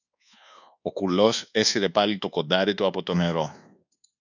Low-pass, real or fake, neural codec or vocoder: 7.2 kHz; fake; codec, 24 kHz, 1.2 kbps, DualCodec